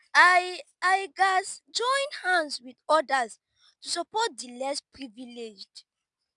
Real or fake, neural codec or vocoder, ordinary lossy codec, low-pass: real; none; none; 10.8 kHz